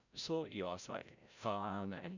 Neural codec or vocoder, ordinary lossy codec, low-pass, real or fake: codec, 16 kHz, 0.5 kbps, FreqCodec, larger model; none; 7.2 kHz; fake